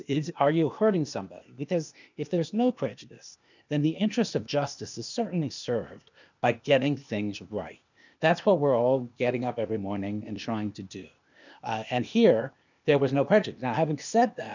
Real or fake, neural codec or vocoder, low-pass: fake; codec, 16 kHz, 0.8 kbps, ZipCodec; 7.2 kHz